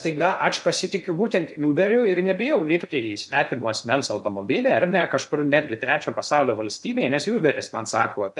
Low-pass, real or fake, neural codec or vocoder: 10.8 kHz; fake; codec, 16 kHz in and 24 kHz out, 0.6 kbps, FocalCodec, streaming, 2048 codes